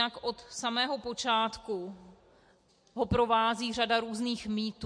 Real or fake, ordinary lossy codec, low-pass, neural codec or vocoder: real; MP3, 48 kbps; 9.9 kHz; none